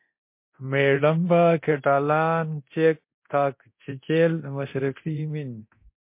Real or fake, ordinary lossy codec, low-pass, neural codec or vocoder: fake; MP3, 24 kbps; 3.6 kHz; codec, 24 kHz, 0.9 kbps, DualCodec